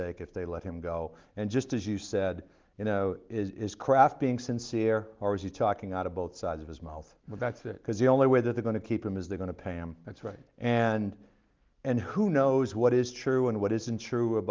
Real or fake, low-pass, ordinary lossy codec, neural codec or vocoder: real; 7.2 kHz; Opus, 32 kbps; none